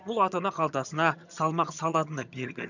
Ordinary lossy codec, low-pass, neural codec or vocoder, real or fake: none; 7.2 kHz; vocoder, 22.05 kHz, 80 mel bands, HiFi-GAN; fake